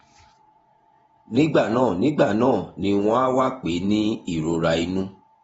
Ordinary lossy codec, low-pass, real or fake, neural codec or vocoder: AAC, 24 kbps; 19.8 kHz; fake; vocoder, 48 kHz, 128 mel bands, Vocos